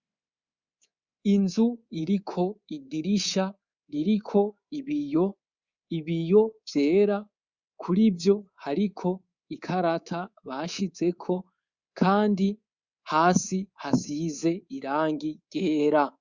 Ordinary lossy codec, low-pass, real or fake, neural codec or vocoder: Opus, 64 kbps; 7.2 kHz; fake; codec, 24 kHz, 3.1 kbps, DualCodec